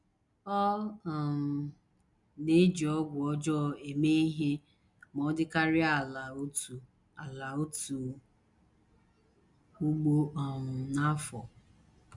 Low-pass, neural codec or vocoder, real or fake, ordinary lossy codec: 10.8 kHz; none; real; MP3, 96 kbps